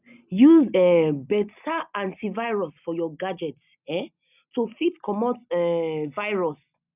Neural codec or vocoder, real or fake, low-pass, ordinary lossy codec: none; real; 3.6 kHz; none